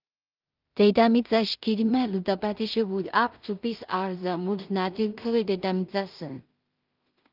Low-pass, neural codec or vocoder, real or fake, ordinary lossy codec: 5.4 kHz; codec, 16 kHz in and 24 kHz out, 0.4 kbps, LongCat-Audio-Codec, two codebook decoder; fake; Opus, 24 kbps